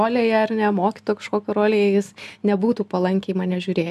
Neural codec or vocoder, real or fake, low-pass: none; real; 14.4 kHz